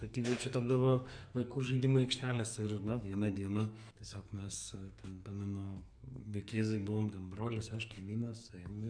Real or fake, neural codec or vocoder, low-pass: fake; codec, 44.1 kHz, 2.6 kbps, SNAC; 9.9 kHz